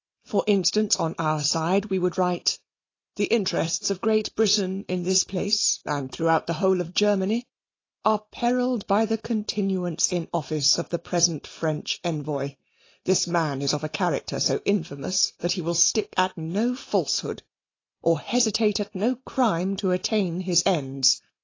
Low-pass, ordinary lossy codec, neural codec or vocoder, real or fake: 7.2 kHz; AAC, 32 kbps; none; real